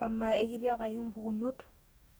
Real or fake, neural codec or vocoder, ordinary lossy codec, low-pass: fake; codec, 44.1 kHz, 2.6 kbps, DAC; none; none